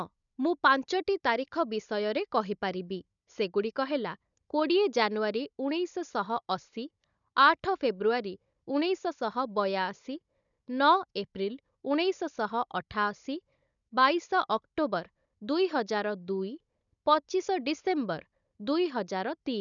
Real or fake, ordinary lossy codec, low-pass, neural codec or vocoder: fake; none; 7.2 kHz; codec, 16 kHz, 16 kbps, FunCodec, trained on Chinese and English, 50 frames a second